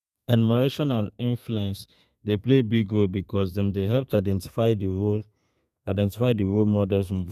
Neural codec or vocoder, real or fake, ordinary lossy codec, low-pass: codec, 32 kHz, 1.9 kbps, SNAC; fake; none; 14.4 kHz